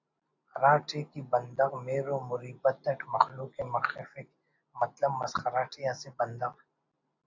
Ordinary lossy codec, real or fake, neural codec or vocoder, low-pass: AAC, 48 kbps; real; none; 7.2 kHz